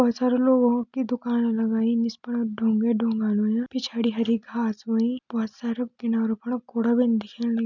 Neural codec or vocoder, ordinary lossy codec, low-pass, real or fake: none; none; 7.2 kHz; real